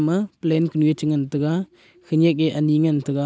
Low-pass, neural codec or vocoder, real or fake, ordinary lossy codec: none; none; real; none